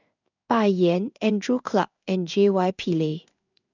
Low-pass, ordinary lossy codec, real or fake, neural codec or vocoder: 7.2 kHz; none; fake; codec, 16 kHz in and 24 kHz out, 1 kbps, XY-Tokenizer